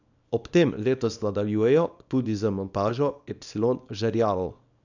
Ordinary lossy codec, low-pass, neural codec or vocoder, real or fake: none; 7.2 kHz; codec, 24 kHz, 0.9 kbps, WavTokenizer, small release; fake